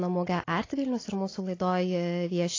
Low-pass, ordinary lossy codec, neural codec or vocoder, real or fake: 7.2 kHz; AAC, 32 kbps; none; real